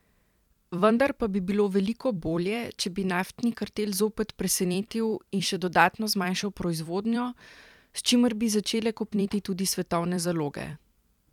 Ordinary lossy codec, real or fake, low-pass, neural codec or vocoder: none; fake; 19.8 kHz; vocoder, 44.1 kHz, 128 mel bands every 256 samples, BigVGAN v2